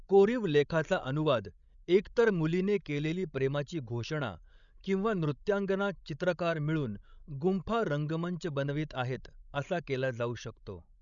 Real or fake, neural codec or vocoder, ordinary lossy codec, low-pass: fake; codec, 16 kHz, 16 kbps, FreqCodec, larger model; none; 7.2 kHz